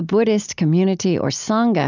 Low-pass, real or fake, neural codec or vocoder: 7.2 kHz; real; none